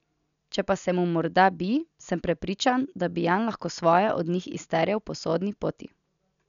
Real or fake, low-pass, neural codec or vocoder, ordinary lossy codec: real; 7.2 kHz; none; none